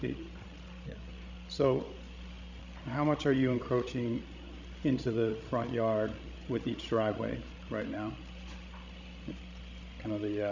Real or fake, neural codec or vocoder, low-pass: fake; codec, 16 kHz, 16 kbps, FreqCodec, larger model; 7.2 kHz